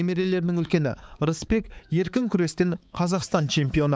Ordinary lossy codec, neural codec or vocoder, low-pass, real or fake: none; codec, 16 kHz, 4 kbps, X-Codec, HuBERT features, trained on balanced general audio; none; fake